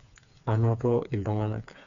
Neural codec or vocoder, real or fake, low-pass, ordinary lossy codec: codec, 16 kHz, 4 kbps, FreqCodec, smaller model; fake; 7.2 kHz; none